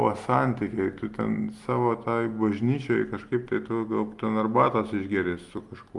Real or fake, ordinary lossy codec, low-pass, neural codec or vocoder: real; Opus, 24 kbps; 10.8 kHz; none